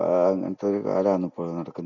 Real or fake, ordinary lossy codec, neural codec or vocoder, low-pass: real; none; none; 7.2 kHz